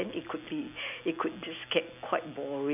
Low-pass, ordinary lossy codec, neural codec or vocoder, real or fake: 3.6 kHz; none; none; real